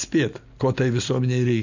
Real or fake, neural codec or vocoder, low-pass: real; none; 7.2 kHz